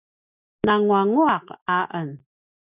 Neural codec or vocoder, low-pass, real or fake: none; 3.6 kHz; real